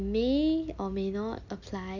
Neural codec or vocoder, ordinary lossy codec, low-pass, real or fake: none; none; 7.2 kHz; real